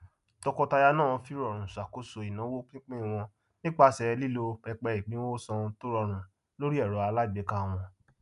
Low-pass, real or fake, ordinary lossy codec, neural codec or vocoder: 10.8 kHz; real; none; none